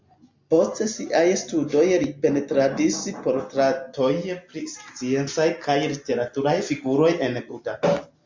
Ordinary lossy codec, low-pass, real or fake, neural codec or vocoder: MP3, 64 kbps; 7.2 kHz; real; none